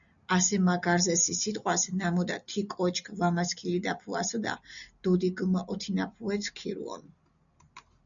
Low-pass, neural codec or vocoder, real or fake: 7.2 kHz; none; real